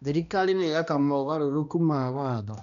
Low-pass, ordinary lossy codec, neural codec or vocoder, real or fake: 7.2 kHz; none; codec, 16 kHz, 1 kbps, X-Codec, HuBERT features, trained on balanced general audio; fake